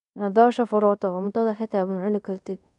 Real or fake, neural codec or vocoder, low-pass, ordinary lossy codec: fake; codec, 24 kHz, 0.5 kbps, DualCodec; 10.8 kHz; none